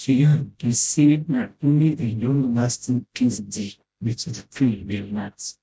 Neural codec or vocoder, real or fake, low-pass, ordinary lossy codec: codec, 16 kHz, 0.5 kbps, FreqCodec, smaller model; fake; none; none